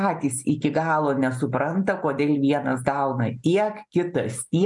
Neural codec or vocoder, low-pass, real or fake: none; 10.8 kHz; real